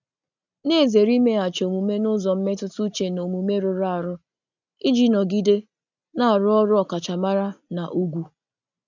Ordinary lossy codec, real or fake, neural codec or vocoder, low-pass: none; real; none; 7.2 kHz